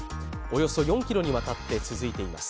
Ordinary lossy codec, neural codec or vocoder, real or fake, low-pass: none; none; real; none